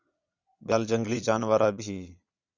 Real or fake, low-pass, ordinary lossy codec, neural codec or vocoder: fake; 7.2 kHz; Opus, 64 kbps; vocoder, 22.05 kHz, 80 mel bands, Vocos